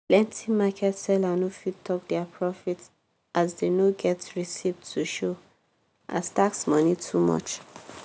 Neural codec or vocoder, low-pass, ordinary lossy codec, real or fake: none; none; none; real